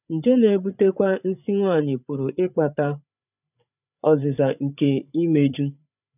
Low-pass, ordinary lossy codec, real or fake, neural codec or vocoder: 3.6 kHz; none; fake; codec, 16 kHz, 8 kbps, FreqCodec, larger model